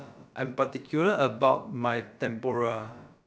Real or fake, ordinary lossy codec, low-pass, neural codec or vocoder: fake; none; none; codec, 16 kHz, about 1 kbps, DyCAST, with the encoder's durations